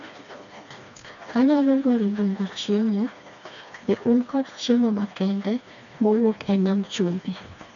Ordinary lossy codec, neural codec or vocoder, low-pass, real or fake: none; codec, 16 kHz, 2 kbps, FreqCodec, smaller model; 7.2 kHz; fake